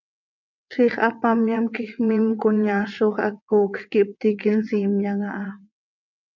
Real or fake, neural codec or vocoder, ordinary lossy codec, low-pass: fake; vocoder, 22.05 kHz, 80 mel bands, WaveNeXt; MP3, 64 kbps; 7.2 kHz